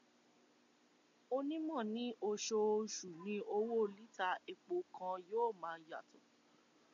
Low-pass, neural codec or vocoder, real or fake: 7.2 kHz; none; real